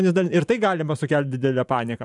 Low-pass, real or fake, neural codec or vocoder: 10.8 kHz; fake; vocoder, 44.1 kHz, 128 mel bands every 512 samples, BigVGAN v2